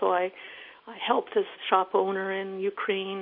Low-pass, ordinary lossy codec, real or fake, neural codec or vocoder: 5.4 kHz; MP3, 32 kbps; real; none